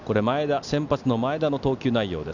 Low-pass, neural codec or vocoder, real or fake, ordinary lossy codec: 7.2 kHz; none; real; none